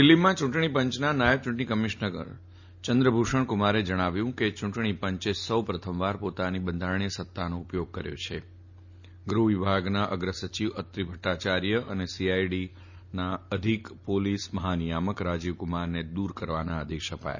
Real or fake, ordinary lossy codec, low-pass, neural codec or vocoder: real; none; 7.2 kHz; none